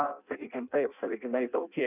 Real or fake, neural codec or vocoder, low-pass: fake; codec, 16 kHz in and 24 kHz out, 0.6 kbps, FireRedTTS-2 codec; 3.6 kHz